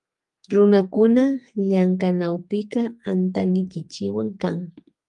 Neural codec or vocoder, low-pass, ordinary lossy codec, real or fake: codec, 32 kHz, 1.9 kbps, SNAC; 10.8 kHz; Opus, 32 kbps; fake